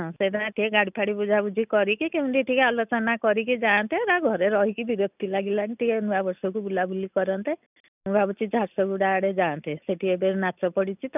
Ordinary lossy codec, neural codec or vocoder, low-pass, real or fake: none; none; 3.6 kHz; real